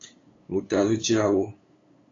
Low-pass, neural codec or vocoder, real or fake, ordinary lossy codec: 7.2 kHz; codec, 16 kHz, 8 kbps, FunCodec, trained on LibriTTS, 25 frames a second; fake; AAC, 32 kbps